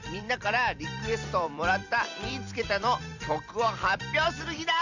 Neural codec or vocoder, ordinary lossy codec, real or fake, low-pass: vocoder, 44.1 kHz, 128 mel bands every 256 samples, BigVGAN v2; none; fake; 7.2 kHz